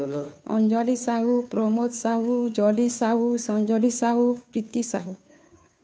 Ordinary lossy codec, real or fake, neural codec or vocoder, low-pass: none; fake; codec, 16 kHz, 2 kbps, FunCodec, trained on Chinese and English, 25 frames a second; none